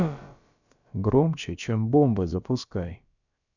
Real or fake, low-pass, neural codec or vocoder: fake; 7.2 kHz; codec, 16 kHz, about 1 kbps, DyCAST, with the encoder's durations